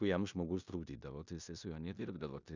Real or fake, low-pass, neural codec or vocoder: fake; 7.2 kHz; codec, 16 kHz in and 24 kHz out, 0.9 kbps, LongCat-Audio-Codec, four codebook decoder